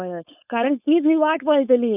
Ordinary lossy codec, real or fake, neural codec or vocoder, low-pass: none; fake; codec, 16 kHz, 4.8 kbps, FACodec; 3.6 kHz